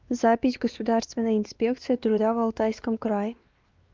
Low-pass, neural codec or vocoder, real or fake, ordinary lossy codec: 7.2 kHz; codec, 16 kHz, 2 kbps, X-Codec, WavLM features, trained on Multilingual LibriSpeech; fake; Opus, 32 kbps